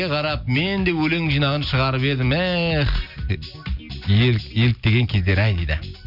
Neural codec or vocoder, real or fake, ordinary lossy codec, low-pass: none; real; none; 5.4 kHz